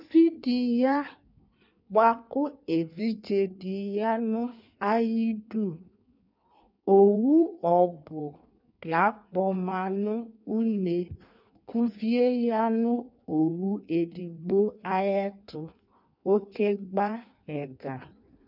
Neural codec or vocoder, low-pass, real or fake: codec, 16 kHz in and 24 kHz out, 1.1 kbps, FireRedTTS-2 codec; 5.4 kHz; fake